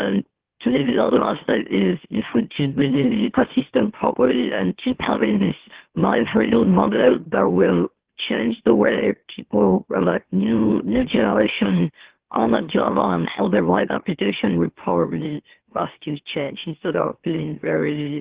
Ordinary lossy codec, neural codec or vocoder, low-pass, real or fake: Opus, 16 kbps; autoencoder, 44.1 kHz, a latent of 192 numbers a frame, MeloTTS; 3.6 kHz; fake